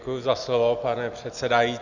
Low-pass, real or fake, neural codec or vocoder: 7.2 kHz; real; none